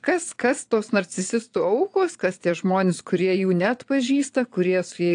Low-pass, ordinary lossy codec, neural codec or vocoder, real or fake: 9.9 kHz; AAC, 64 kbps; vocoder, 22.05 kHz, 80 mel bands, Vocos; fake